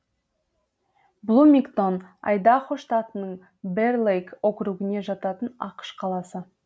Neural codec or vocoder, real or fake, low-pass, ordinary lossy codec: none; real; none; none